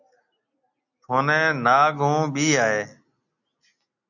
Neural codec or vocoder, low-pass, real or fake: none; 7.2 kHz; real